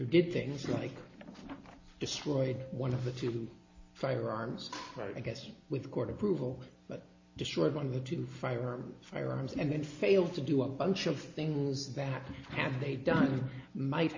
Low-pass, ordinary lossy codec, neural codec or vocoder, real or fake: 7.2 kHz; MP3, 32 kbps; none; real